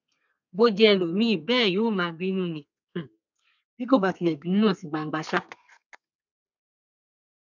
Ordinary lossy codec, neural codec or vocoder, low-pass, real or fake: none; codec, 32 kHz, 1.9 kbps, SNAC; 7.2 kHz; fake